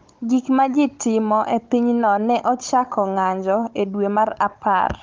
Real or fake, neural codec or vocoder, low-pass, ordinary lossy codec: fake; codec, 16 kHz, 16 kbps, FunCodec, trained on Chinese and English, 50 frames a second; 7.2 kHz; Opus, 24 kbps